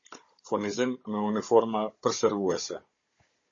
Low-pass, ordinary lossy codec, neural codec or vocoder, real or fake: 7.2 kHz; MP3, 32 kbps; codec, 16 kHz, 16 kbps, FunCodec, trained on Chinese and English, 50 frames a second; fake